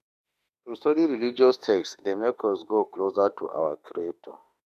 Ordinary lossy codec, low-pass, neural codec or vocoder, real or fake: none; 14.4 kHz; autoencoder, 48 kHz, 32 numbers a frame, DAC-VAE, trained on Japanese speech; fake